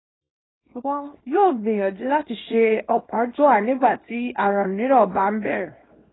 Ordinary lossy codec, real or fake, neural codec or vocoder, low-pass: AAC, 16 kbps; fake; codec, 24 kHz, 0.9 kbps, WavTokenizer, small release; 7.2 kHz